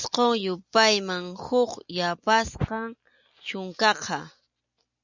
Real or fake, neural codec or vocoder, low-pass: real; none; 7.2 kHz